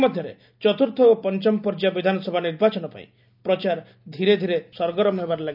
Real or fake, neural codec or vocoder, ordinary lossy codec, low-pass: real; none; none; 5.4 kHz